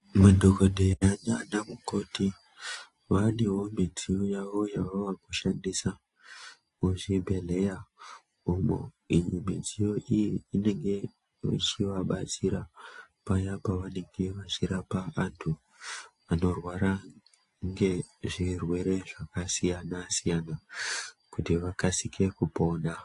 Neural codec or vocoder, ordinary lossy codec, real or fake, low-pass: none; AAC, 48 kbps; real; 10.8 kHz